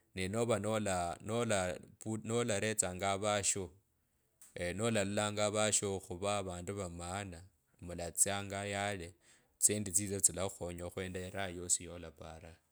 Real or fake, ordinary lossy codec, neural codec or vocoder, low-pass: real; none; none; none